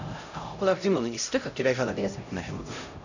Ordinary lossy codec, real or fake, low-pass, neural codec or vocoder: none; fake; 7.2 kHz; codec, 16 kHz, 0.5 kbps, X-Codec, HuBERT features, trained on LibriSpeech